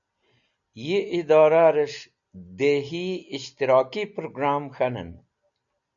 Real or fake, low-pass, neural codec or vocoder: real; 7.2 kHz; none